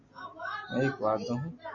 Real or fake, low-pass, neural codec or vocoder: real; 7.2 kHz; none